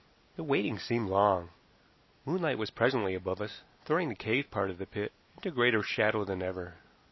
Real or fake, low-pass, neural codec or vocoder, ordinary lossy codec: real; 7.2 kHz; none; MP3, 24 kbps